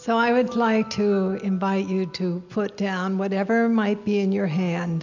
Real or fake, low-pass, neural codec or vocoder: real; 7.2 kHz; none